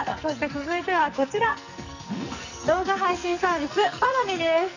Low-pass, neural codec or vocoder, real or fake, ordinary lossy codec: 7.2 kHz; codec, 44.1 kHz, 2.6 kbps, SNAC; fake; none